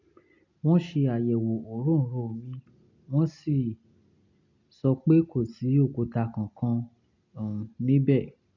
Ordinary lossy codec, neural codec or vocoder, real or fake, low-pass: none; none; real; 7.2 kHz